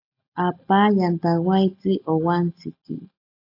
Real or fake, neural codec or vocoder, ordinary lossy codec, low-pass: real; none; AAC, 32 kbps; 5.4 kHz